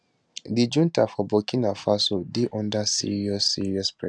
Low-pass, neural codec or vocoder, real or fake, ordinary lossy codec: none; none; real; none